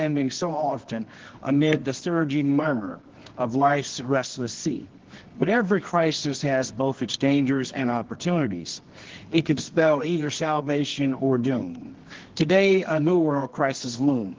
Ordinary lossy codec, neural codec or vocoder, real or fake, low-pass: Opus, 16 kbps; codec, 24 kHz, 0.9 kbps, WavTokenizer, medium music audio release; fake; 7.2 kHz